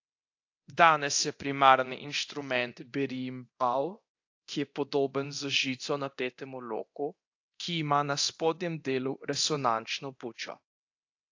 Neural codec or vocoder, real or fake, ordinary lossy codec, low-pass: codec, 24 kHz, 0.9 kbps, DualCodec; fake; AAC, 48 kbps; 7.2 kHz